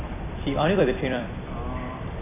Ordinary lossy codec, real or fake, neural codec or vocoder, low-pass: none; fake; vocoder, 44.1 kHz, 128 mel bands every 256 samples, BigVGAN v2; 3.6 kHz